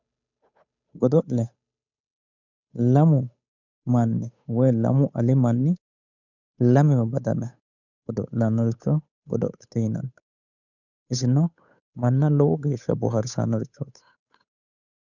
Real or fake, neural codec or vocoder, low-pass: fake; codec, 16 kHz, 8 kbps, FunCodec, trained on Chinese and English, 25 frames a second; 7.2 kHz